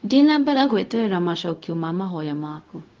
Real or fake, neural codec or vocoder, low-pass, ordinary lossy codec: fake; codec, 16 kHz, 0.4 kbps, LongCat-Audio-Codec; 7.2 kHz; Opus, 32 kbps